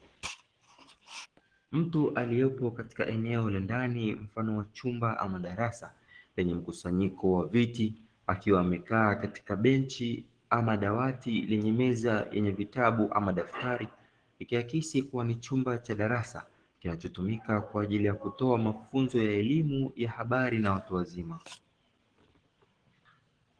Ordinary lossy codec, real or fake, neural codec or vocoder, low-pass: Opus, 16 kbps; fake; codec, 44.1 kHz, 7.8 kbps, DAC; 9.9 kHz